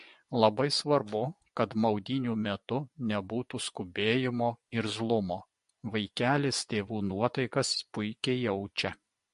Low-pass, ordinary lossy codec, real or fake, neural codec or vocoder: 10.8 kHz; MP3, 48 kbps; fake; vocoder, 24 kHz, 100 mel bands, Vocos